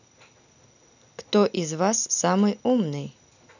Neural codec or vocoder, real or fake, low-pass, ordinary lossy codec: none; real; 7.2 kHz; none